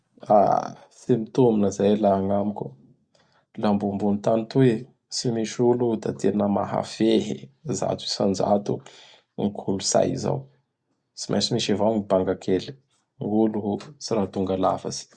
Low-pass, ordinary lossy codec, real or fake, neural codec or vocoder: 9.9 kHz; Opus, 64 kbps; real; none